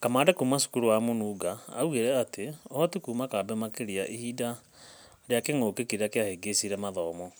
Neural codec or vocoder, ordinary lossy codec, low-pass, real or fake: none; none; none; real